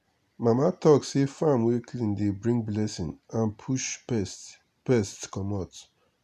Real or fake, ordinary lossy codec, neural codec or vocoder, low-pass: real; none; none; 14.4 kHz